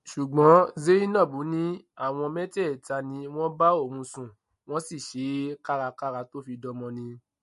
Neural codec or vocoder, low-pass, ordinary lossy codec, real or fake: none; 14.4 kHz; MP3, 48 kbps; real